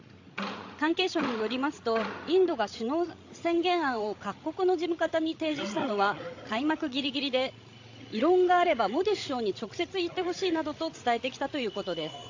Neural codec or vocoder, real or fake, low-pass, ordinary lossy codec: codec, 16 kHz, 16 kbps, FreqCodec, larger model; fake; 7.2 kHz; AAC, 48 kbps